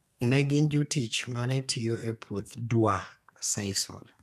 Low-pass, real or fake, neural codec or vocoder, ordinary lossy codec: 14.4 kHz; fake; codec, 32 kHz, 1.9 kbps, SNAC; none